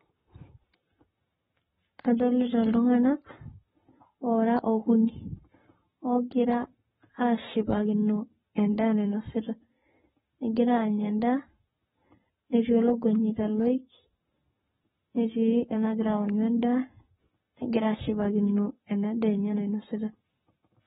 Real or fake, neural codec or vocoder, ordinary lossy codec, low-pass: fake; codec, 44.1 kHz, 7.8 kbps, Pupu-Codec; AAC, 16 kbps; 19.8 kHz